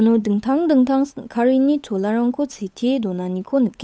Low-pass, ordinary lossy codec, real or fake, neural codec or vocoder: none; none; fake; codec, 16 kHz, 8 kbps, FunCodec, trained on Chinese and English, 25 frames a second